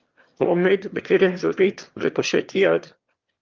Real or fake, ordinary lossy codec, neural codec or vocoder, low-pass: fake; Opus, 32 kbps; autoencoder, 22.05 kHz, a latent of 192 numbers a frame, VITS, trained on one speaker; 7.2 kHz